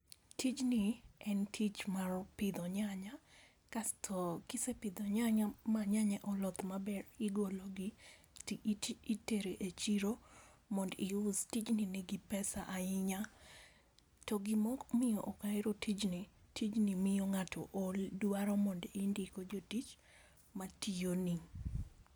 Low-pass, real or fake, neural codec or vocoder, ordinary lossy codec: none; real; none; none